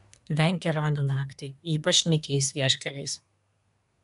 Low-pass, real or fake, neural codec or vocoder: 10.8 kHz; fake; codec, 24 kHz, 1 kbps, SNAC